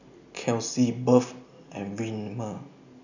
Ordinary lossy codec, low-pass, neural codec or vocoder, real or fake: none; 7.2 kHz; none; real